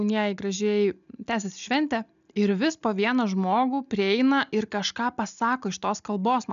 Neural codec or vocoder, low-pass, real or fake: none; 7.2 kHz; real